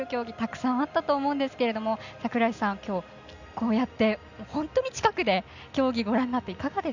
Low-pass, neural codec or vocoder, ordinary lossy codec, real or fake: 7.2 kHz; none; none; real